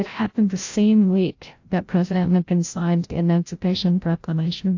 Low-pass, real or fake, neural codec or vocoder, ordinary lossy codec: 7.2 kHz; fake; codec, 16 kHz, 0.5 kbps, FreqCodec, larger model; AAC, 48 kbps